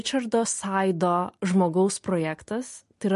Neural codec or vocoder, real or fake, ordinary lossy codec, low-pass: none; real; MP3, 48 kbps; 14.4 kHz